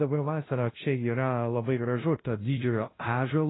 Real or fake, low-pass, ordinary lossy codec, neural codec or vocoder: fake; 7.2 kHz; AAC, 16 kbps; codec, 16 kHz, 0.5 kbps, FunCodec, trained on LibriTTS, 25 frames a second